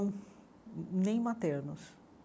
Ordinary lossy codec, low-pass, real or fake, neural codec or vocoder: none; none; real; none